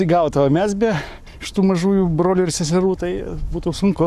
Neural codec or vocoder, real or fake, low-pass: codec, 44.1 kHz, 7.8 kbps, Pupu-Codec; fake; 14.4 kHz